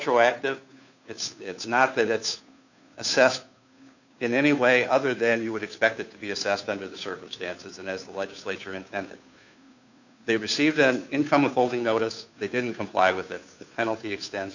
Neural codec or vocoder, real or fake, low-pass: codec, 16 kHz, 2 kbps, FunCodec, trained on Chinese and English, 25 frames a second; fake; 7.2 kHz